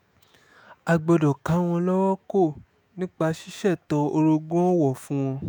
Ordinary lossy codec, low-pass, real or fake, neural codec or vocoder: none; none; fake; autoencoder, 48 kHz, 128 numbers a frame, DAC-VAE, trained on Japanese speech